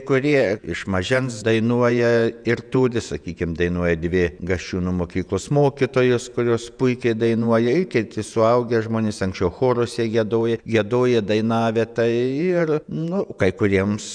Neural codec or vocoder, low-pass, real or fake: vocoder, 48 kHz, 128 mel bands, Vocos; 9.9 kHz; fake